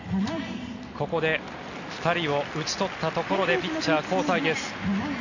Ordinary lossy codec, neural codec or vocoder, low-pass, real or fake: none; none; 7.2 kHz; real